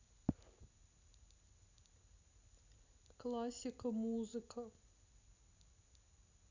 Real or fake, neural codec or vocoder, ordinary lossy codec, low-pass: real; none; none; 7.2 kHz